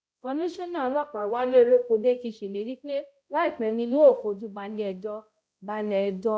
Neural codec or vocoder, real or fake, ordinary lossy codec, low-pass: codec, 16 kHz, 0.5 kbps, X-Codec, HuBERT features, trained on balanced general audio; fake; none; none